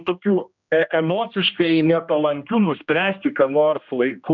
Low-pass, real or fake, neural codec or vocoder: 7.2 kHz; fake; codec, 16 kHz, 1 kbps, X-Codec, HuBERT features, trained on general audio